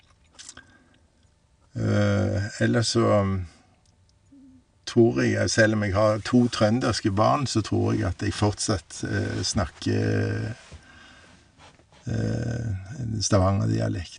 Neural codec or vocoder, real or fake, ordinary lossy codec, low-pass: none; real; none; 9.9 kHz